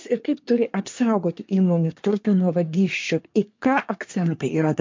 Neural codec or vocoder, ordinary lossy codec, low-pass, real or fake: codec, 24 kHz, 1 kbps, SNAC; MP3, 48 kbps; 7.2 kHz; fake